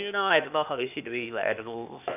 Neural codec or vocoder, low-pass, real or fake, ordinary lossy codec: codec, 16 kHz, 0.8 kbps, ZipCodec; 3.6 kHz; fake; none